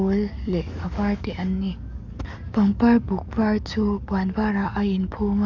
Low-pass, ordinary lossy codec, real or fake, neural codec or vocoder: 7.2 kHz; none; fake; codec, 44.1 kHz, 7.8 kbps, DAC